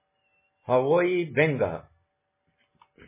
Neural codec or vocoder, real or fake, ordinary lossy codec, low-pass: none; real; MP3, 16 kbps; 3.6 kHz